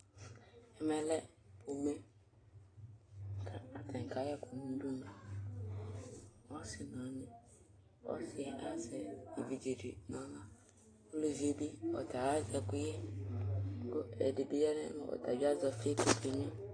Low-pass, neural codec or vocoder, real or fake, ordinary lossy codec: 9.9 kHz; none; real; AAC, 32 kbps